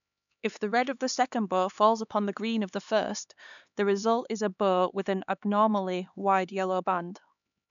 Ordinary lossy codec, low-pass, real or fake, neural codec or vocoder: none; 7.2 kHz; fake; codec, 16 kHz, 4 kbps, X-Codec, HuBERT features, trained on LibriSpeech